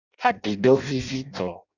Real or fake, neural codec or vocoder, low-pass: fake; codec, 16 kHz in and 24 kHz out, 0.6 kbps, FireRedTTS-2 codec; 7.2 kHz